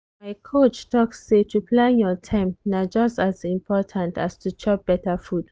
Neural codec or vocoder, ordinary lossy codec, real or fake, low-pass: none; none; real; none